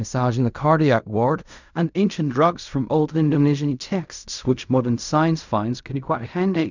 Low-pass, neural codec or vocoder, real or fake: 7.2 kHz; codec, 16 kHz in and 24 kHz out, 0.4 kbps, LongCat-Audio-Codec, fine tuned four codebook decoder; fake